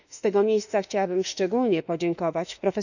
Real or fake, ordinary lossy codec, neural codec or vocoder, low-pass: fake; none; autoencoder, 48 kHz, 32 numbers a frame, DAC-VAE, trained on Japanese speech; 7.2 kHz